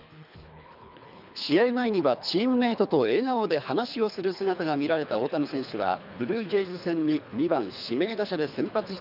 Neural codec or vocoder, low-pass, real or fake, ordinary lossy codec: codec, 24 kHz, 3 kbps, HILCodec; 5.4 kHz; fake; none